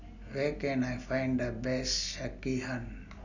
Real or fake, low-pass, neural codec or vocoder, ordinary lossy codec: real; 7.2 kHz; none; none